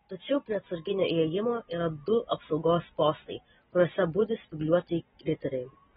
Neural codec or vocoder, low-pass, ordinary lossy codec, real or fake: none; 19.8 kHz; AAC, 16 kbps; real